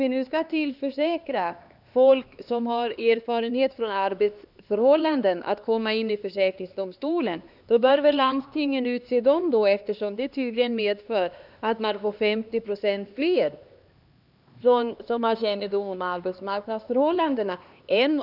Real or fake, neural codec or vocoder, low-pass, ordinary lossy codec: fake; codec, 16 kHz, 2 kbps, X-Codec, HuBERT features, trained on LibriSpeech; 5.4 kHz; none